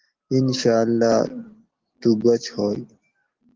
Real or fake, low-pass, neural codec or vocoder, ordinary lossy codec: real; 7.2 kHz; none; Opus, 16 kbps